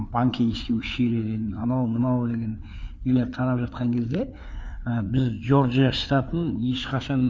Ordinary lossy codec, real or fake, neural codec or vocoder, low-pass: none; fake; codec, 16 kHz, 4 kbps, FreqCodec, larger model; none